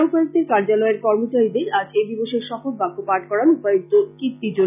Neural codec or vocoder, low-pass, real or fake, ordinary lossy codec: none; 3.6 kHz; real; none